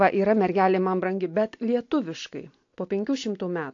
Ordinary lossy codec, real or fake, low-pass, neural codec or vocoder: AAC, 48 kbps; real; 7.2 kHz; none